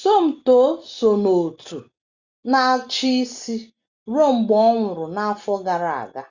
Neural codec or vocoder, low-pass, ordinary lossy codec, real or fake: none; 7.2 kHz; Opus, 64 kbps; real